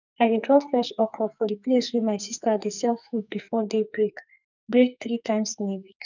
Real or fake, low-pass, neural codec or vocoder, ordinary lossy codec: fake; 7.2 kHz; codec, 44.1 kHz, 2.6 kbps, SNAC; none